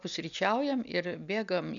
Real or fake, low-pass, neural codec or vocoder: real; 7.2 kHz; none